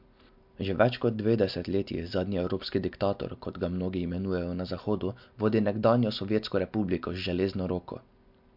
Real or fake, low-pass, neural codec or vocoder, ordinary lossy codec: real; 5.4 kHz; none; MP3, 48 kbps